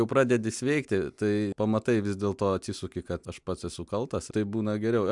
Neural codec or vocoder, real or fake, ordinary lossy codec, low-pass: none; real; MP3, 96 kbps; 10.8 kHz